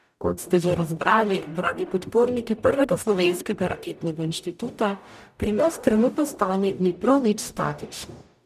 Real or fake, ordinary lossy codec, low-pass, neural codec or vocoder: fake; none; 14.4 kHz; codec, 44.1 kHz, 0.9 kbps, DAC